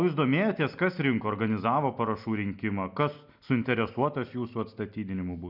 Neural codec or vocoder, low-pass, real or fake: none; 5.4 kHz; real